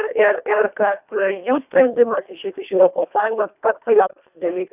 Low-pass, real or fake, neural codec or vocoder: 3.6 kHz; fake; codec, 24 kHz, 1.5 kbps, HILCodec